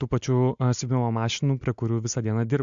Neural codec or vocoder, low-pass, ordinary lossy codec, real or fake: none; 7.2 kHz; MP3, 64 kbps; real